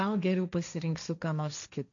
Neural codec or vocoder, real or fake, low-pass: codec, 16 kHz, 1.1 kbps, Voila-Tokenizer; fake; 7.2 kHz